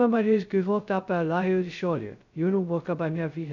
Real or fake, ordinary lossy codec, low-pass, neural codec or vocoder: fake; none; 7.2 kHz; codec, 16 kHz, 0.2 kbps, FocalCodec